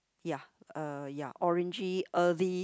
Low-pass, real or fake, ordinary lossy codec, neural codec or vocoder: none; real; none; none